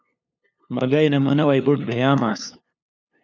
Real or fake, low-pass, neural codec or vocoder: fake; 7.2 kHz; codec, 16 kHz, 2 kbps, FunCodec, trained on LibriTTS, 25 frames a second